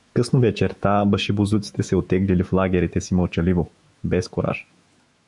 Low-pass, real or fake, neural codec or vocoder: 10.8 kHz; fake; autoencoder, 48 kHz, 128 numbers a frame, DAC-VAE, trained on Japanese speech